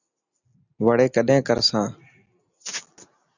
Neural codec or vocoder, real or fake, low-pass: none; real; 7.2 kHz